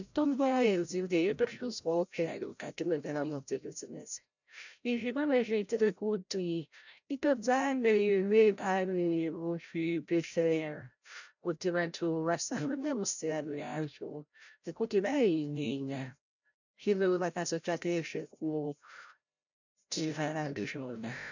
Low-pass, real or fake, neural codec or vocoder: 7.2 kHz; fake; codec, 16 kHz, 0.5 kbps, FreqCodec, larger model